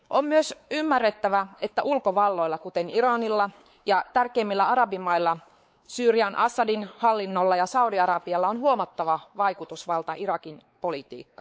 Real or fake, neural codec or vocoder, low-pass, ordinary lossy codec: fake; codec, 16 kHz, 4 kbps, X-Codec, WavLM features, trained on Multilingual LibriSpeech; none; none